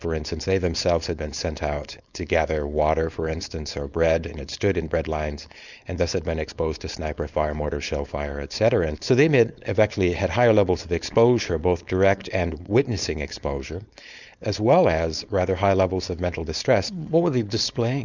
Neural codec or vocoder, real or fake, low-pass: codec, 16 kHz, 4.8 kbps, FACodec; fake; 7.2 kHz